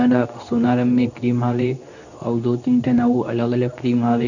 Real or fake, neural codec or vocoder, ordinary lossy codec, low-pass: fake; codec, 24 kHz, 0.9 kbps, WavTokenizer, medium speech release version 2; none; 7.2 kHz